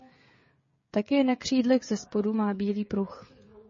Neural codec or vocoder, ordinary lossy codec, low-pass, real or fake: codec, 16 kHz, 16 kbps, FreqCodec, smaller model; MP3, 32 kbps; 7.2 kHz; fake